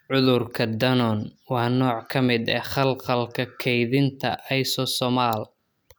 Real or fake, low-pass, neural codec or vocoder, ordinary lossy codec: real; none; none; none